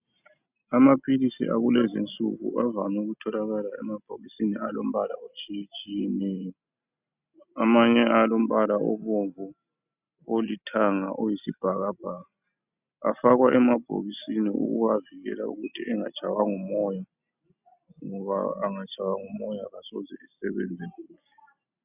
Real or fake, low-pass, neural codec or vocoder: real; 3.6 kHz; none